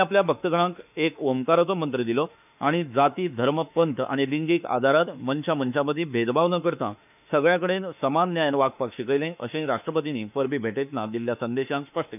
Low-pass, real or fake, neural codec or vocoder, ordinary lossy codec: 3.6 kHz; fake; autoencoder, 48 kHz, 32 numbers a frame, DAC-VAE, trained on Japanese speech; none